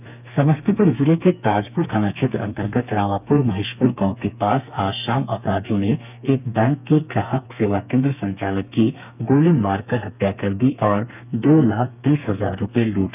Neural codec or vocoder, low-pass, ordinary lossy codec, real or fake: codec, 32 kHz, 1.9 kbps, SNAC; 3.6 kHz; none; fake